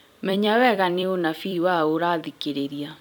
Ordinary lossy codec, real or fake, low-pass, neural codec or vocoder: none; fake; 19.8 kHz; vocoder, 44.1 kHz, 128 mel bands every 256 samples, BigVGAN v2